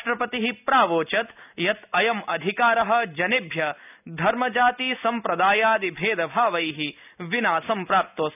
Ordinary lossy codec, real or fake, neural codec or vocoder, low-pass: none; real; none; 3.6 kHz